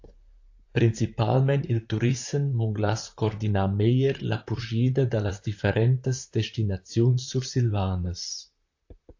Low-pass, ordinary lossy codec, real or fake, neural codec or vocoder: 7.2 kHz; AAC, 48 kbps; fake; vocoder, 44.1 kHz, 128 mel bands, Pupu-Vocoder